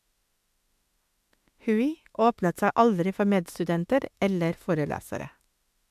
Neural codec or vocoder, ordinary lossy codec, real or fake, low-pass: autoencoder, 48 kHz, 32 numbers a frame, DAC-VAE, trained on Japanese speech; MP3, 96 kbps; fake; 14.4 kHz